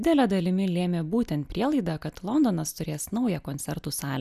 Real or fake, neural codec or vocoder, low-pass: real; none; 14.4 kHz